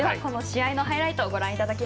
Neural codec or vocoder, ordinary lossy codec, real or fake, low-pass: none; none; real; none